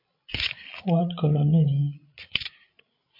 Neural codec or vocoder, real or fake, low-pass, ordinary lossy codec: codec, 16 kHz, 16 kbps, FreqCodec, larger model; fake; 5.4 kHz; MP3, 32 kbps